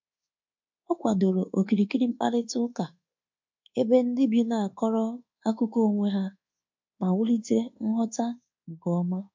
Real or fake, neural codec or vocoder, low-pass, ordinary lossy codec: fake; codec, 24 kHz, 1.2 kbps, DualCodec; 7.2 kHz; MP3, 48 kbps